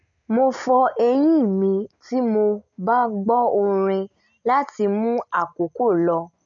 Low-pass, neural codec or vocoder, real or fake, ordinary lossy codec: 7.2 kHz; none; real; none